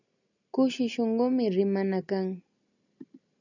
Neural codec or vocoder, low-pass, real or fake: none; 7.2 kHz; real